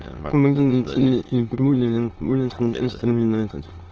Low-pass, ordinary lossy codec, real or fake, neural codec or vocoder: 7.2 kHz; Opus, 16 kbps; fake; autoencoder, 22.05 kHz, a latent of 192 numbers a frame, VITS, trained on many speakers